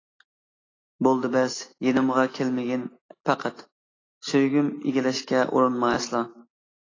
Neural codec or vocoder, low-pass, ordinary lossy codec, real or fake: none; 7.2 kHz; AAC, 32 kbps; real